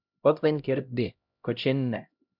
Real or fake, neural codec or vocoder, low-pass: fake; codec, 16 kHz, 0.5 kbps, X-Codec, HuBERT features, trained on LibriSpeech; 5.4 kHz